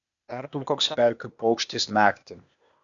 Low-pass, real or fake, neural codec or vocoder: 7.2 kHz; fake; codec, 16 kHz, 0.8 kbps, ZipCodec